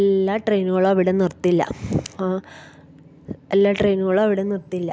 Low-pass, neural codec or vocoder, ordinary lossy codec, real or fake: none; none; none; real